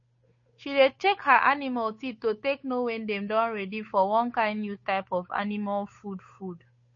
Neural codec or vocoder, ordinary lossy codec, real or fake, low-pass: codec, 16 kHz, 8 kbps, FunCodec, trained on Chinese and English, 25 frames a second; MP3, 32 kbps; fake; 7.2 kHz